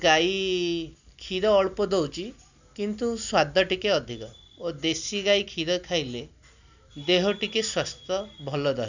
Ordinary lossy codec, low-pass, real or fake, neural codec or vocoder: none; 7.2 kHz; real; none